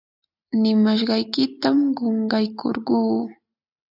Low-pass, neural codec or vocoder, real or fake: 5.4 kHz; none; real